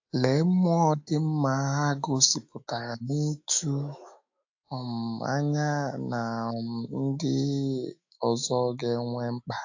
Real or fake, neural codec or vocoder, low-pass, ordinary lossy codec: fake; autoencoder, 48 kHz, 128 numbers a frame, DAC-VAE, trained on Japanese speech; 7.2 kHz; AAC, 48 kbps